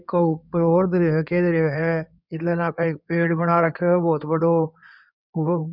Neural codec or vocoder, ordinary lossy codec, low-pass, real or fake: codec, 16 kHz in and 24 kHz out, 2.2 kbps, FireRedTTS-2 codec; none; 5.4 kHz; fake